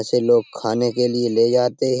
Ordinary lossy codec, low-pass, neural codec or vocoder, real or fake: none; none; none; real